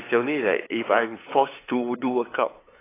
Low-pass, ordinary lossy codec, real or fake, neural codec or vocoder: 3.6 kHz; AAC, 16 kbps; fake; codec, 16 kHz, 8 kbps, FunCodec, trained on LibriTTS, 25 frames a second